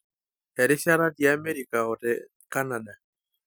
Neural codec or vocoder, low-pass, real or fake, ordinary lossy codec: none; none; real; none